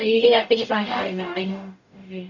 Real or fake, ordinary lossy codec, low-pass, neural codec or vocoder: fake; none; 7.2 kHz; codec, 44.1 kHz, 0.9 kbps, DAC